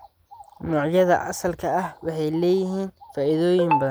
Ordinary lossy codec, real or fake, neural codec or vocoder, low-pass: none; real; none; none